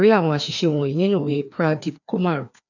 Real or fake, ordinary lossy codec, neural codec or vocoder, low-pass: fake; none; codec, 16 kHz, 2 kbps, FreqCodec, larger model; 7.2 kHz